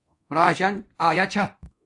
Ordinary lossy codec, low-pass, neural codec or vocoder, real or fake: AAC, 64 kbps; 10.8 kHz; codec, 24 kHz, 0.9 kbps, DualCodec; fake